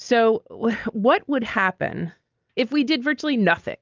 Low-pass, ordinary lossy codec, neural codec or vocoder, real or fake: 7.2 kHz; Opus, 24 kbps; none; real